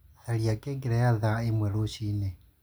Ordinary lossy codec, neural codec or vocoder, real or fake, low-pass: none; none; real; none